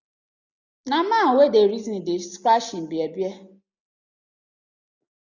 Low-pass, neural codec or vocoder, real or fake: 7.2 kHz; none; real